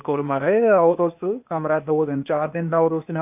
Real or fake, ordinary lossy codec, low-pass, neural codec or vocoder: fake; none; 3.6 kHz; codec, 16 kHz, 0.8 kbps, ZipCodec